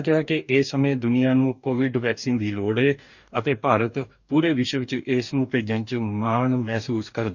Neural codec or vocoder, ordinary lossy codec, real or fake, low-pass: codec, 44.1 kHz, 2.6 kbps, DAC; none; fake; 7.2 kHz